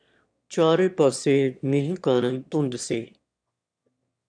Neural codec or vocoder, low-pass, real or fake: autoencoder, 22.05 kHz, a latent of 192 numbers a frame, VITS, trained on one speaker; 9.9 kHz; fake